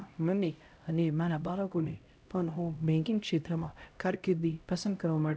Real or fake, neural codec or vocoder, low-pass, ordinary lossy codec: fake; codec, 16 kHz, 0.5 kbps, X-Codec, HuBERT features, trained on LibriSpeech; none; none